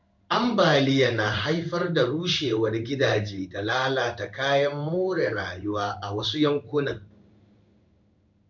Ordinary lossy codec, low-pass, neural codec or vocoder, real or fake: MP3, 64 kbps; 7.2 kHz; codec, 16 kHz in and 24 kHz out, 1 kbps, XY-Tokenizer; fake